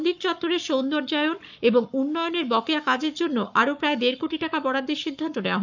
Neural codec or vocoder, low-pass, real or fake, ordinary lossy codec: codec, 44.1 kHz, 7.8 kbps, Pupu-Codec; 7.2 kHz; fake; none